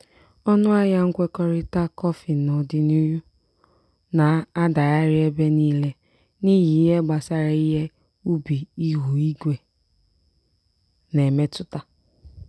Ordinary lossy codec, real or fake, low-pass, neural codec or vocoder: none; real; none; none